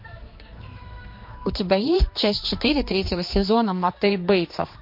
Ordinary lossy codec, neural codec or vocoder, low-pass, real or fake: MP3, 32 kbps; codec, 16 kHz, 2 kbps, X-Codec, HuBERT features, trained on general audio; 5.4 kHz; fake